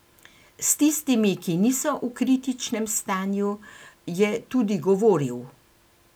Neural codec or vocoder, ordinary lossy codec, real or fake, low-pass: none; none; real; none